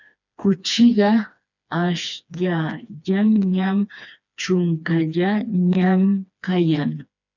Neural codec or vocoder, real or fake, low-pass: codec, 16 kHz, 2 kbps, FreqCodec, smaller model; fake; 7.2 kHz